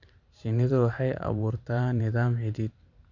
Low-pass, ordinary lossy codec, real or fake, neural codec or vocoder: 7.2 kHz; none; fake; vocoder, 44.1 kHz, 128 mel bands every 512 samples, BigVGAN v2